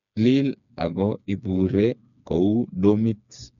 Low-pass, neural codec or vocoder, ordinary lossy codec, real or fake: 7.2 kHz; codec, 16 kHz, 4 kbps, FreqCodec, smaller model; none; fake